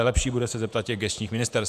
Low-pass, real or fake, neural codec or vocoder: 14.4 kHz; fake; vocoder, 48 kHz, 128 mel bands, Vocos